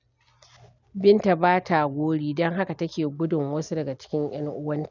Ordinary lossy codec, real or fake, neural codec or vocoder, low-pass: none; real; none; 7.2 kHz